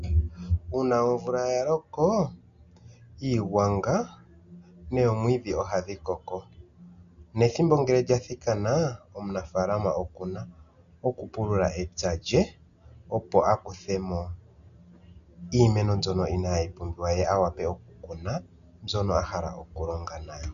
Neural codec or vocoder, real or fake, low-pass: none; real; 7.2 kHz